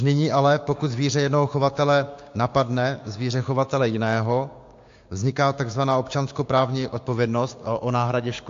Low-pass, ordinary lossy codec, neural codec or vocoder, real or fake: 7.2 kHz; AAC, 48 kbps; codec, 16 kHz, 6 kbps, DAC; fake